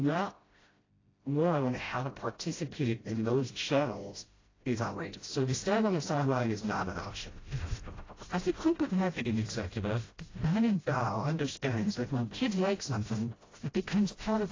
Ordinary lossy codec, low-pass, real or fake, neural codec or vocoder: AAC, 32 kbps; 7.2 kHz; fake; codec, 16 kHz, 0.5 kbps, FreqCodec, smaller model